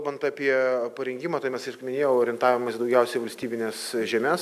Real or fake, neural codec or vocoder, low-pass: real; none; 14.4 kHz